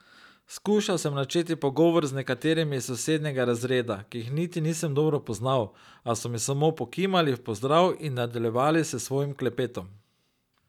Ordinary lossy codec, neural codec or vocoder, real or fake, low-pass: none; none; real; 19.8 kHz